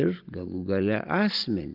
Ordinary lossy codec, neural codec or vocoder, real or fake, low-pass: Opus, 24 kbps; none; real; 5.4 kHz